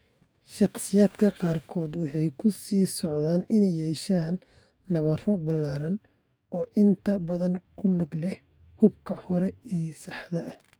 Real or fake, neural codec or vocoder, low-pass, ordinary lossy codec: fake; codec, 44.1 kHz, 2.6 kbps, DAC; none; none